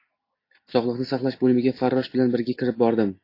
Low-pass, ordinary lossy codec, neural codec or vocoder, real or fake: 5.4 kHz; AAC, 32 kbps; none; real